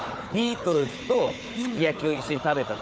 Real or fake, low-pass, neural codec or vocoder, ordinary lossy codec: fake; none; codec, 16 kHz, 4 kbps, FunCodec, trained on Chinese and English, 50 frames a second; none